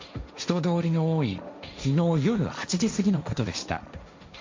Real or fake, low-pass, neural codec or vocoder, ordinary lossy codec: fake; 7.2 kHz; codec, 16 kHz, 1.1 kbps, Voila-Tokenizer; MP3, 48 kbps